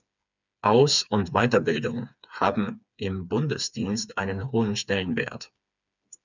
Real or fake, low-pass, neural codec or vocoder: fake; 7.2 kHz; codec, 16 kHz, 4 kbps, FreqCodec, smaller model